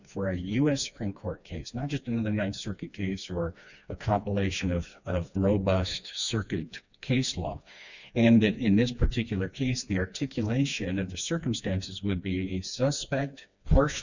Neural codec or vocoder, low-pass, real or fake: codec, 16 kHz, 2 kbps, FreqCodec, smaller model; 7.2 kHz; fake